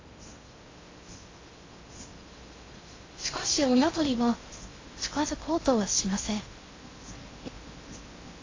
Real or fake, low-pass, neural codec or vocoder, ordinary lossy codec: fake; 7.2 kHz; codec, 16 kHz in and 24 kHz out, 0.6 kbps, FocalCodec, streaming, 2048 codes; AAC, 32 kbps